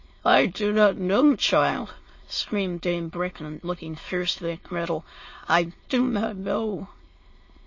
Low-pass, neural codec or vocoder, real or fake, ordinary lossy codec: 7.2 kHz; autoencoder, 22.05 kHz, a latent of 192 numbers a frame, VITS, trained on many speakers; fake; MP3, 32 kbps